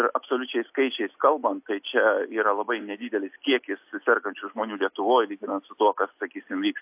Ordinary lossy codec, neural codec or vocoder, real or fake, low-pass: AAC, 32 kbps; none; real; 3.6 kHz